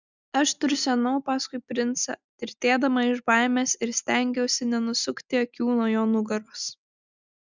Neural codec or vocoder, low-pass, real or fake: none; 7.2 kHz; real